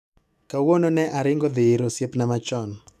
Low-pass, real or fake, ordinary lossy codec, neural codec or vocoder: 14.4 kHz; fake; none; autoencoder, 48 kHz, 128 numbers a frame, DAC-VAE, trained on Japanese speech